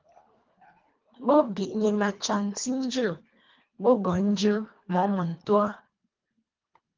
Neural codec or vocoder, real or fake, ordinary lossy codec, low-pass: codec, 24 kHz, 1.5 kbps, HILCodec; fake; Opus, 24 kbps; 7.2 kHz